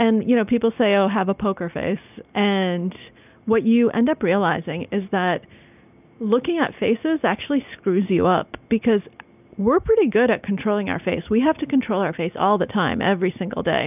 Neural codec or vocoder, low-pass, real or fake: none; 3.6 kHz; real